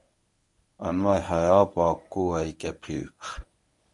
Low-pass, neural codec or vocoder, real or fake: 10.8 kHz; codec, 24 kHz, 0.9 kbps, WavTokenizer, medium speech release version 1; fake